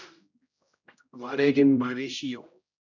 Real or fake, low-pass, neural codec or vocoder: fake; 7.2 kHz; codec, 16 kHz, 0.5 kbps, X-Codec, HuBERT features, trained on general audio